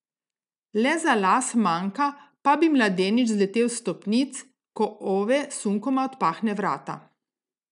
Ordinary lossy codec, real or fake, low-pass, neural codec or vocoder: none; real; 10.8 kHz; none